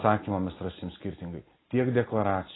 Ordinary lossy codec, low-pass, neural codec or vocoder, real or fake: AAC, 16 kbps; 7.2 kHz; none; real